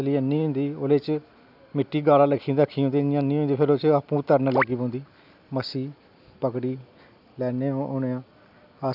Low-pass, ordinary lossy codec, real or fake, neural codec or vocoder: 5.4 kHz; none; real; none